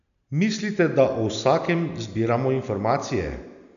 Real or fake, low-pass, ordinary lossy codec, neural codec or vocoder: real; 7.2 kHz; none; none